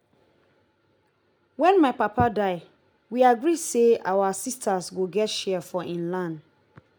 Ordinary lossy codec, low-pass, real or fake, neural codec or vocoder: none; none; real; none